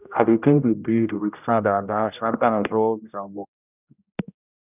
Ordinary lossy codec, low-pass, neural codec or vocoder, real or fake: none; 3.6 kHz; codec, 16 kHz, 0.5 kbps, X-Codec, HuBERT features, trained on general audio; fake